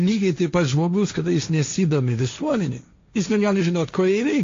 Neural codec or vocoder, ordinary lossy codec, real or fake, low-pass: codec, 16 kHz, 1.1 kbps, Voila-Tokenizer; AAC, 48 kbps; fake; 7.2 kHz